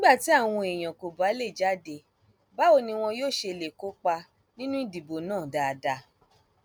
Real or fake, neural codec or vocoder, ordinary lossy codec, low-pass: real; none; none; none